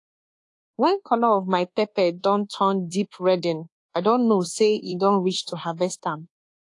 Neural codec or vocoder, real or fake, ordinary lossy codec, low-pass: codec, 24 kHz, 1.2 kbps, DualCodec; fake; AAC, 48 kbps; 10.8 kHz